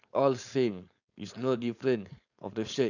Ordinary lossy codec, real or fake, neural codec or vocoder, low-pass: none; fake; codec, 16 kHz, 4.8 kbps, FACodec; 7.2 kHz